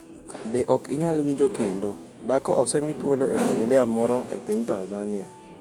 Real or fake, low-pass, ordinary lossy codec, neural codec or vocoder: fake; 19.8 kHz; none; codec, 44.1 kHz, 2.6 kbps, DAC